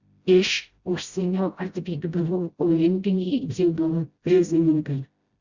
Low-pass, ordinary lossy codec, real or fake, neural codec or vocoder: 7.2 kHz; Opus, 64 kbps; fake; codec, 16 kHz, 0.5 kbps, FreqCodec, smaller model